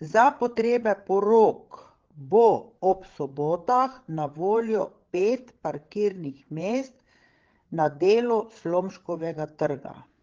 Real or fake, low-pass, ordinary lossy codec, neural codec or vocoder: fake; 7.2 kHz; Opus, 16 kbps; codec, 16 kHz, 8 kbps, FreqCodec, larger model